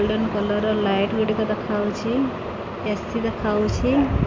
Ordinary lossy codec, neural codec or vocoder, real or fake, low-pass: MP3, 48 kbps; none; real; 7.2 kHz